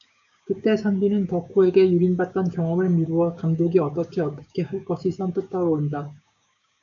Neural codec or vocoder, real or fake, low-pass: codec, 16 kHz, 6 kbps, DAC; fake; 7.2 kHz